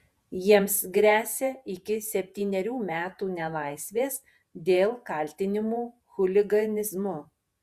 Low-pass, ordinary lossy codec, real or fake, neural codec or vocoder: 14.4 kHz; Opus, 64 kbps; fake; vocoder, 48 kHz, 128 mel bands, Vocos